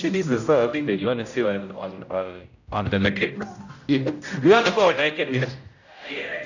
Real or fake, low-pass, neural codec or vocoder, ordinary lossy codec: fake; 7.2 kHz; codec, 16 kHz, 0.5 kbps, X-Codec, HuBERT features, trained on general audio; none